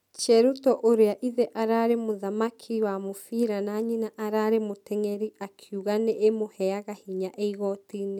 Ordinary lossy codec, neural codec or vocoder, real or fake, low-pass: none; none; real; 19.8 kHz